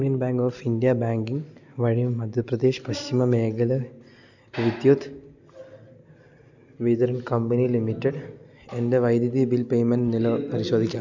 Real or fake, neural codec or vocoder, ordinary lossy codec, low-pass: real; none; none; 7.2 kHz